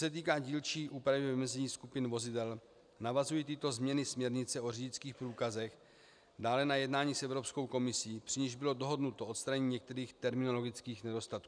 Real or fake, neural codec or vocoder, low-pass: real; none; 9.9 kHz